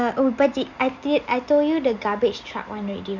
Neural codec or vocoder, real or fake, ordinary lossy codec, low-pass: none; real; none; 7.2 kHz